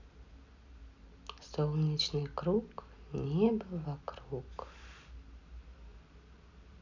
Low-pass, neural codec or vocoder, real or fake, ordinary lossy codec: 7.2 kHz; none; real; none